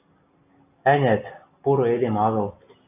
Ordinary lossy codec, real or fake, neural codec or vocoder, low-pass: AAC, 32 kbps; real; none; 3.6 kHz